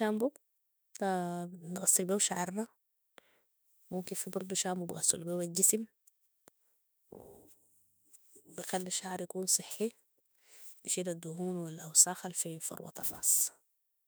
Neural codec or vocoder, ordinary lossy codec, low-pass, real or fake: autoencoder, 48 kHz, 32 numbers a frame, DAC-VAE, trained on Japanese speech; none; none; fake